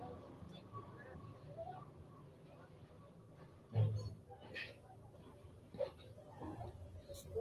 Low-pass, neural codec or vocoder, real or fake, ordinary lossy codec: 14.4 kHz; none; real; Opus, 24 kbps